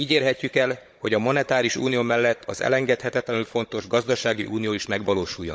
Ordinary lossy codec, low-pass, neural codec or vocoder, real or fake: none; none; codec, 16 kHz, 16 kbps, FunCodec, trained on Chinese and English, 50 frames a second; fake